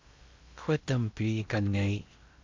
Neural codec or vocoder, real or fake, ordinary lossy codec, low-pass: codec, 16 kHz in and 24 kHz out, 0.8 kbps, FocalCodec, streaming, 65536 codes; fake; MP3, 64 kbps; 7.2 kHz